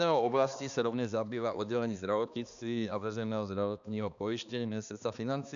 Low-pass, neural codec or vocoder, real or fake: 7.2 kHz; codec, 16 kHz, 2 kbps, X-Codec, HuBERT features, trained on balanced general audio; fake